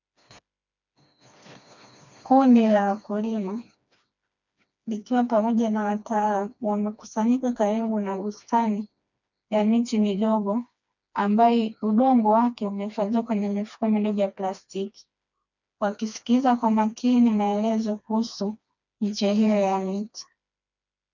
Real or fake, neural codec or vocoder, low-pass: fake; codec, 16 kHz, 2 kbps, FreqCodec, smaller model; 7.2 kHz